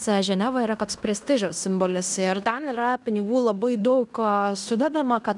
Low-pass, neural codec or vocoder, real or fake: 10.8 kHz; codec, 16 kHz in and 24 kHz out, 0.9 kbps, LongCat-Audio-Codec, fine tuned four codebook decoder; fake